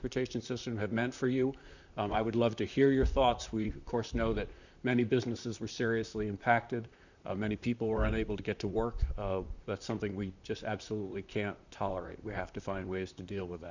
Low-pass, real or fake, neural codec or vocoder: 7.2 kHz; fake; vocoder, 44.1 kHz, 128 mel bands, Pupu-Vocoder